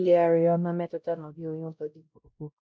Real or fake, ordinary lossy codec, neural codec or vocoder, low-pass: fake; none; codec, 16 kHz, 0.5 kbps, X-Codec, WavLM features, trained on Multilingual LibriSpeech; none